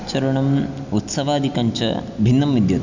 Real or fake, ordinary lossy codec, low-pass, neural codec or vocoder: real; none; 7.2 kHz; none